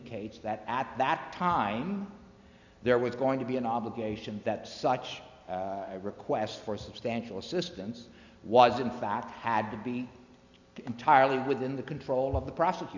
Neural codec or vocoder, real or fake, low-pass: none; real; 7.2 kHz